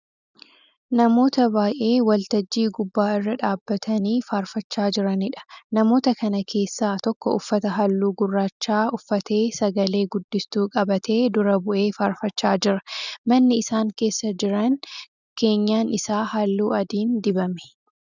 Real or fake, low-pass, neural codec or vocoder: real; 7.2 kHz; none